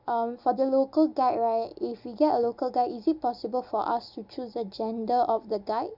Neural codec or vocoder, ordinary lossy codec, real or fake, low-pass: none; none; real; 5.4 kHz